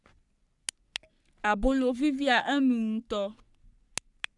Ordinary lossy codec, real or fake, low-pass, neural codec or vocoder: none; fake; 10.8 kHz; codec, 44.1 kHz, 3.4 kbps, Pupu-Codec